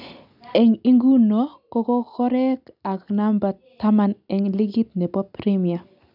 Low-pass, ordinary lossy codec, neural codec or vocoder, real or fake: 5.4 kHz; none; none; real